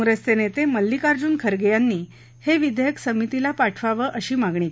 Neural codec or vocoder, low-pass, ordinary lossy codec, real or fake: none; none; none; real